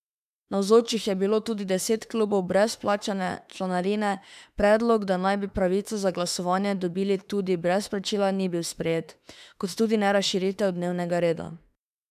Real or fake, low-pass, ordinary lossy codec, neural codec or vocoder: fake; 14.4 kHz; none; autoencoder, 48 kHz, 32 numbers a frame, DAC-VAE, trained on Japanese speech